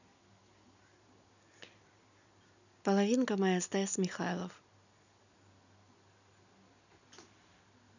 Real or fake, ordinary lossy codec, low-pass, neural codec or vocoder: real; none; 7.2 kHz; none